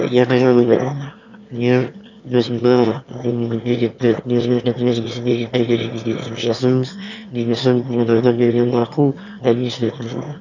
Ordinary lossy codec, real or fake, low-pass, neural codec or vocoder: none; fake; 7.2 kHz; autoencoder, 22.05 kHz, a latent of 192 numbers a frame, VITS, trained on one speaker